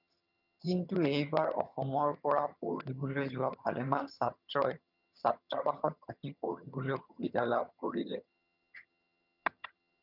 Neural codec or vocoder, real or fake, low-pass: vocoder, 22.05 kHz, 80 mel bands, HiFi-GAN; fake; 5.4 kHz